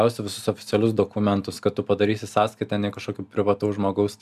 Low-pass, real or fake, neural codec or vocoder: 14.4 kHz; real; none